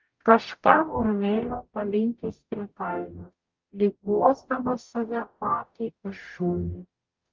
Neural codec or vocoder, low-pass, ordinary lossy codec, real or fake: codec, 44.1 kHz, 0.9 kbps, DAC; 7.2 kHz; Opus, 24 kbps; fake